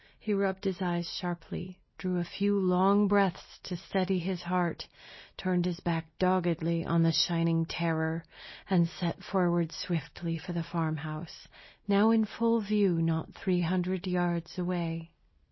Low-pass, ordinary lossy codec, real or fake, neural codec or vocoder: 7.2 kHz; MP3, 24 kbps; real; none